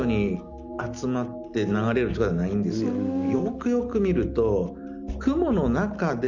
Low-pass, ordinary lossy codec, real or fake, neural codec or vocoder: 7.2 kHz; none; real; none